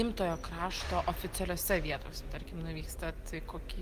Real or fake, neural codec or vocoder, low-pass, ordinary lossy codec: real; none; 14.4 kHz; Opus, 24 kbps